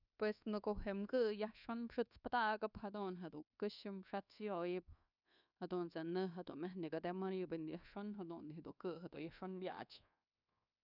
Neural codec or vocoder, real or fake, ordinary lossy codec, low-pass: codec, 24 kHz, 1.2 kbps, DualCodec; fake; none; 5.4 kHz